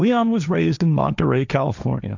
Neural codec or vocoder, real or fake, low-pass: codec, 16 kHz, 1.1 kbps, Voila-Tokenizer; fake; 7.2 kHz